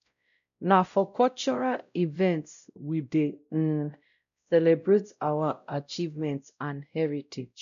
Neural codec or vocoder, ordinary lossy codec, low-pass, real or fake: codec, 16 kHz, 0.5 kbps, X-Codec, WavLM features, trained on Multilingual LibriSpeech; none; 7.2 kHz; fake